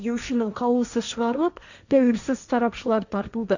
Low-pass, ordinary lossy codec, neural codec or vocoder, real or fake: 7.2 kHz; none; codec, 16 kHz, 1.1 kbps, Voila-Tokenizer; fake